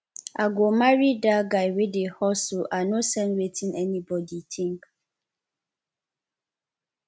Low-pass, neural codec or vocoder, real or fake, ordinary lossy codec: none; none; real; none